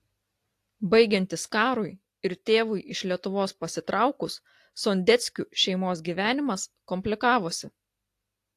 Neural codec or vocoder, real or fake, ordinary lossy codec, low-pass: none; real; AAC, 64 kbps; 14.4 kHz